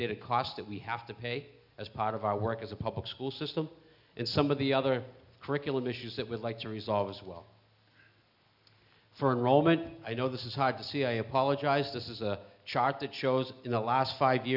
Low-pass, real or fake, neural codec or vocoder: 5.4 kHz; real; none